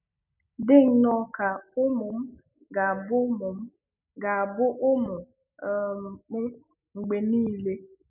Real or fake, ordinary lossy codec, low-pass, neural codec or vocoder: real; none; 3.6 kHz; none